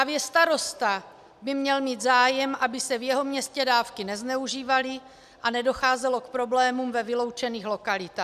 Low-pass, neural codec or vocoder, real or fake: 14.4 kHz; none; real